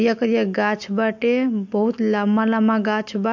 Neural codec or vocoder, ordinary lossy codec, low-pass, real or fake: none; MP3, 48 kbps; 7.2 kHz; real